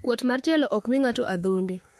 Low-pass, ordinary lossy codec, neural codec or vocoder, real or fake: 19.8 kHz; MP3, 64 kbps; autoencoder, 48 kHz, 32 numbers a frame, DAC-VAE, trained on Japanese speech; fake